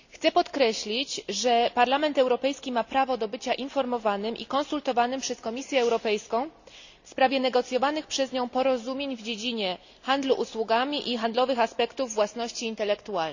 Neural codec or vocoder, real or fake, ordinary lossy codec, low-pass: none; real; none; 7.2 kHz